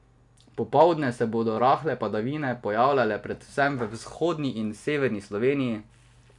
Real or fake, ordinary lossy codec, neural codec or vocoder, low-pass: real; none; none; 10.8 kHz